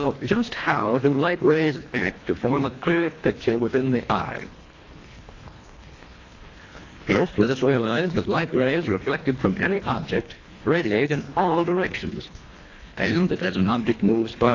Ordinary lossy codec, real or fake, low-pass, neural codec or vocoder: AAC, 32 kbps; fake; 7.2 kHz; codec, 24 kHz, 1.5 kbps, HILCodec